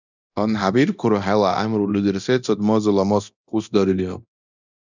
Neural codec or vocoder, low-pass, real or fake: codec, 24 kHz, 0.9 kbps, DualCodec; 7.2 kHz; fake